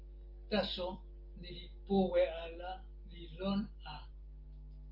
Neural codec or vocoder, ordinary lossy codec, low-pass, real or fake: none; Opus, 24 kbps; 5.4 kHz; real